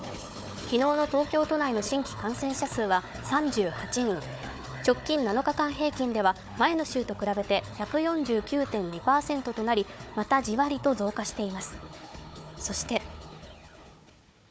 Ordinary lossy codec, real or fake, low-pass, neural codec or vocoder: none; fake; none; codec, 16 kHz, 4 kbps, FunCodec, trained on Chinese and English, 50 frames a second